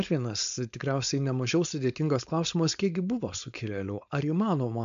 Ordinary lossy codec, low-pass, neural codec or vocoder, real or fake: MP3, 64 kbps; 7.2 kHz; codec, 16 kHz, 4.8 kbps, FACodec; fake